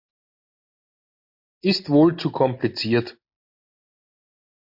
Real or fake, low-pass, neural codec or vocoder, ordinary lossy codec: real; 5.4 kHz; none; MP3, 32 kbps